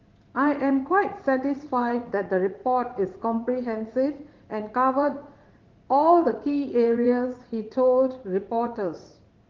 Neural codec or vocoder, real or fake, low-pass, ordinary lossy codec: vocoder, 44.1 kHz, 80 mel bands, Vocos; fake; 7.2 kHz; Opus, 16 kbps